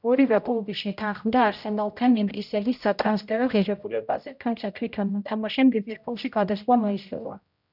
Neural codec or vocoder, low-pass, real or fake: codec, 16 kHz, 0.5 kbps, X-Codec, HuBERT features, trained on general audio; 5.4 kHz; fake